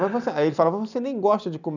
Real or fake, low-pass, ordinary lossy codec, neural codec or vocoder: real; 7.2 kHz; none; none